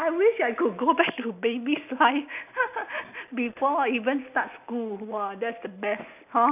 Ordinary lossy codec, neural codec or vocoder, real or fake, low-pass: none; none; real; 3.6 kHz